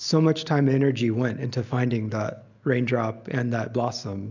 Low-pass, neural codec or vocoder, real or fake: 7.2 kHz; none; real